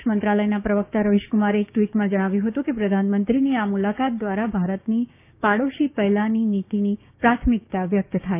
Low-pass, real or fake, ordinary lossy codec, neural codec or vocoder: 3.6 kHz; fake; none; codec, 16 kHz, 6 kbps, DAC